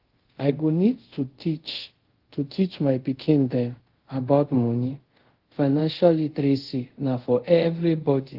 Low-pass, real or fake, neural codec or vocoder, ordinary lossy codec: 5.4 kHz; fake; codec, 24 kHz, 0.5 kbps, DualCodec; Opus, 16 kbps